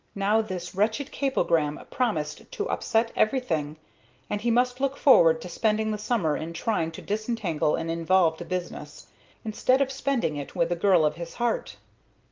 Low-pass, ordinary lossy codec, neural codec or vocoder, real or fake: 7.2 kHz; Opus, 24 kbps; none; real